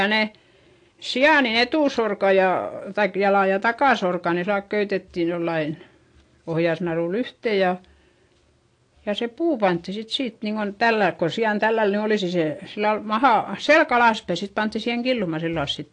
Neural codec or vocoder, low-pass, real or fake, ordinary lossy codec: none; 9.9 kHz; real; AAC, 48 kbps